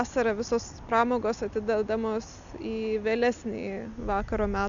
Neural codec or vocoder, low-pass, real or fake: none; 7.2 kHz; real